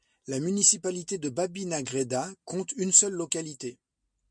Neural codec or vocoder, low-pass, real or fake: none; 9.9 kHz; real